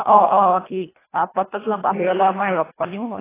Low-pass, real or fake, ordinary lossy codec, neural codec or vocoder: 3.6 kHz; fake; AAC, 16 kbps; codec, 24 kHz, 1.5 kbps, HILCodec